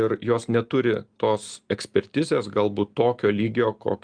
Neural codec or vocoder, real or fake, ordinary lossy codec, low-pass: none; real; Opus, 32 kbps; 9.9 kHz